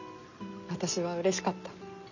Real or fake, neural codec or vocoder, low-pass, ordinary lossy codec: real; none; 7.2 kHz; none